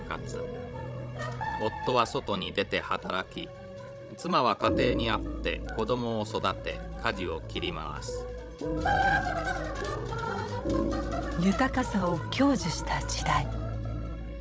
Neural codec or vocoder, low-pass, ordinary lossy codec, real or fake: codec, 16 kHz, 16 kbps, FreqCodec, larger model; none; none; fake